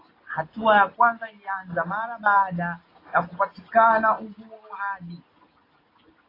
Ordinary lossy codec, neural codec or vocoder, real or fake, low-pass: AAC, 24 kbps; none; real; 5.4 kHz